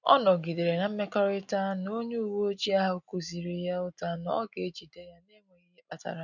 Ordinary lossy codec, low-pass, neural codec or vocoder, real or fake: none; 7.2 kHz; none; real